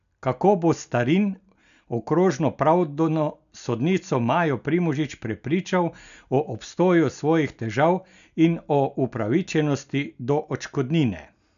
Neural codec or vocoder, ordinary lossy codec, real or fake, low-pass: none; none; real; 7.2 kHz